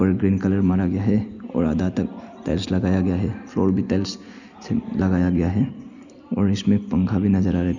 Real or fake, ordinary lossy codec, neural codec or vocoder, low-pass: real; none; none; 7.2 kHz